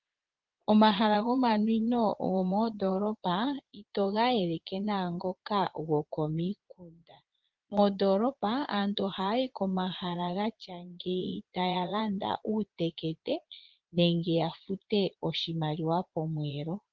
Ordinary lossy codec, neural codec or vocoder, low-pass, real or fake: Opus, 16 kbps; vocoder, 22.05 kHz, 80 mel bands, Vocos; 7.2 kHz; fake